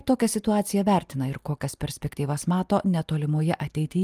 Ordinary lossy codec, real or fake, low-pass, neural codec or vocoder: Opus, 32 kbps; real; 14.4 kHz; none